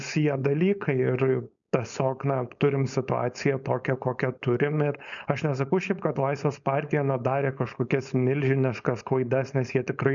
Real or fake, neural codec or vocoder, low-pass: fake; codec, 16 kHz, 4.8 kbps, FACodec; 7.2 kHz